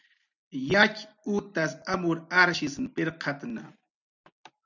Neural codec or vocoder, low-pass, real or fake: none; 7.2 kHz; real